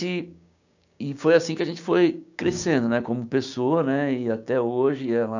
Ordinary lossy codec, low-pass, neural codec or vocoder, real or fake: none; 7.2 kHz; codec, 16 kHz, 6 kbps, DAC; fake